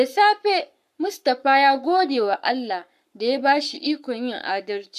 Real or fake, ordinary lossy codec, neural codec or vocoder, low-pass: fake; none; codec, 44.1 kHz, 7.8 kbps, Pupu-Codec; 14.4 kHz